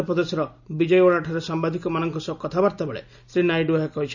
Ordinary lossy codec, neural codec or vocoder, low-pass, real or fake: none; none; 7.2 kHz; real